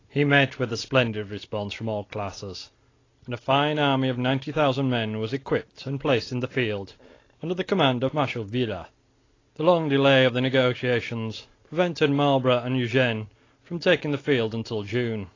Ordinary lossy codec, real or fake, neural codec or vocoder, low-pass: AAC, 32 kbps; real; none; 7.2 kHz